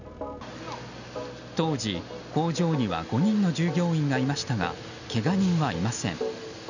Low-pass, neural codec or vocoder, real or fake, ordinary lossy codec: 7.2 kHz; none; real; none